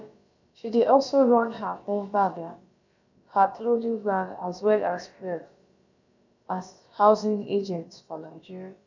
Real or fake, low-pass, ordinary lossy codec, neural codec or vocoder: fake; 7.2 kHz; none; codec, 16 kHz, about 1 kbps, DyCAST, with the encoder's durations